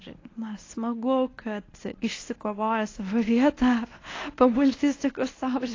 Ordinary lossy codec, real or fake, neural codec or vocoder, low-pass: AAC, 32 kbps; fake; codec, 24 kHz, 0.9 kbps, WavTokenizer, medium speech release version 1; 7.2 kHz